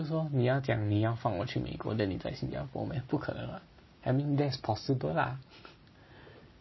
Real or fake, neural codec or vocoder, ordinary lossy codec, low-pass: real; none; MP3, 24 kbps; 7.2 kHz